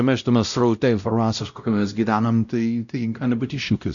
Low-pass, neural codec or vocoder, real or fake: 7.2 kHz; codec, 16 kHz, 0.5 kbps, X-Codec, WavLM features, trained on Multilingual LibriSpeech; fake